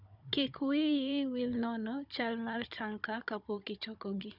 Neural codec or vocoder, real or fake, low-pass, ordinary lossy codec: codec, 16 kHz, 16 kbps, FunCodec, trained on Chinese and English, 50 frames a second; fake; 5.4 kHz; none